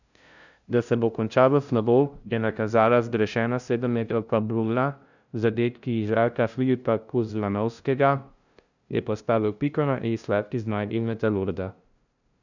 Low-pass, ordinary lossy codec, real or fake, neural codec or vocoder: 7.2 kHz; none; fake; codec, 16 kHz, 0.5 kbps, FunCodec, trained on LibriTTS, 25 frames a second